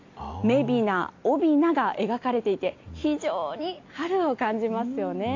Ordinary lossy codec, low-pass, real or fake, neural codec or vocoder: AAC, 48 kbps; 7.2 kHz; real; none